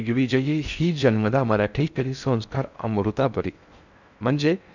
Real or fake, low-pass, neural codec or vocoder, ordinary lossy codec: fake; 7.2 kHz; codec, 16 kHz in and 24 kHz out, 0.6 kbps, FocalCodec, streaming, 4096 codes; none